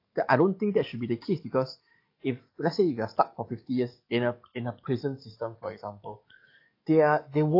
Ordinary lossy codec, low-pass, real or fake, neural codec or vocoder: AAC, 32 kbps; 5.4 kHz; fake; codec, 44.1 kHz, 7.8 kbps, DAC